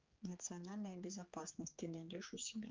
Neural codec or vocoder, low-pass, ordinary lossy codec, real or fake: codec, 16 kHz, 4 kbps, X-Codec, HuBERT features, trained on general audio; 7.2 kHz; Opus, 24 kbps; fake